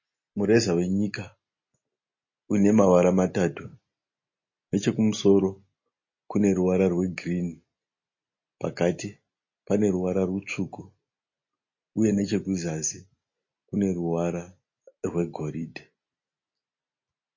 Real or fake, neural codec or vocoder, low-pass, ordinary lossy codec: real; none; 7.2 kHz; MP3, 32 kbps